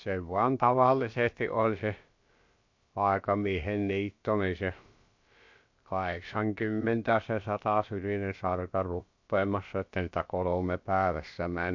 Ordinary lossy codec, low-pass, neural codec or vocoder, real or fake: MP3, 48 kbps; 7.2 kHz; codec, 16 kHz, about 1 kbps, DyCAST, with the encoder's durations; fake